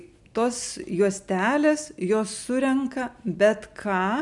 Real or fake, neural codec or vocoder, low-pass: real; none; 10.8 kHz